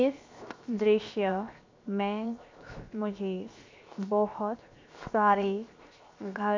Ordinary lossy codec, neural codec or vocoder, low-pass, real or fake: MP3, 64 kbps; codec, 16 kHz, 0.7 kbps, FocalCodec; 7.2 kHz; fake